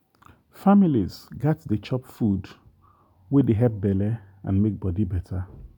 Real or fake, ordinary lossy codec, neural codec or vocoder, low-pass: real; none; none; 19.8 kHz